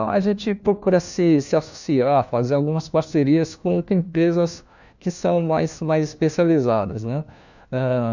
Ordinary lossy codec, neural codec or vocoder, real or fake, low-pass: none; codec, 16 kHz, 1 kbps, FunCodec, trained on LibriTTS, 50 frames a second; fake; 7.2 kHz